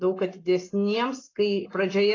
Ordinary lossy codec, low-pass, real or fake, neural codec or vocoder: AAC, 32 kbps; 7.2 kHz; real; none